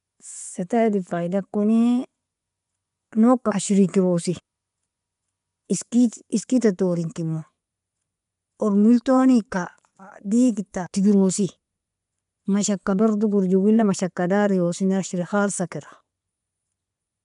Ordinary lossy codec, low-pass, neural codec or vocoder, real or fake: none; 10.8 kHz; vocoder, 24 kHz, 100 mel bands, Vocos; fake